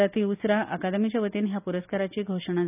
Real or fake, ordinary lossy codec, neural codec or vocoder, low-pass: real; none; none; 3.6 kHz